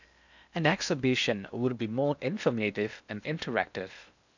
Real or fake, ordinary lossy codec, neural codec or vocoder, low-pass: fake; none; codec, 16 kHz in and 24 kHz out, 0.8 kbps, FocalCodec, streaming, 65536 codes; 7.2 kHz